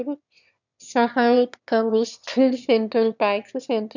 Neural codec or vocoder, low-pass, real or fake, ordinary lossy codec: autoencoder, 22.05 kHz, a latent of 192 numbers a frame, VITS, trained on one speaker; 7.2 kHz; fake; none